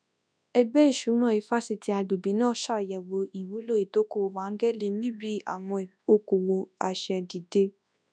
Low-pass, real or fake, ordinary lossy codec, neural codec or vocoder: 9.9 kHz; fake; none; codec, 24 kHz, 0.9 kbps, WavTokenizer, large speech release